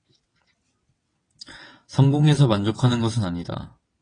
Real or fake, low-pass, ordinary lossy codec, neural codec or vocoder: fake; 9.9 kHz; AAC, 32 kbps; vocoder, 22.05 kHz, 80 mel bands, WaveNeXt